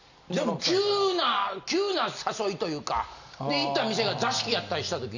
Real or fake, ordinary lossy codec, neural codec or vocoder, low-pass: real; none; none; 7.2 kHz